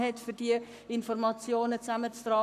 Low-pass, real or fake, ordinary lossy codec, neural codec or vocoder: 14.4 kHz; fake; none; codec, 44.1 kHz, 7.8 kbps, Pupu-Codec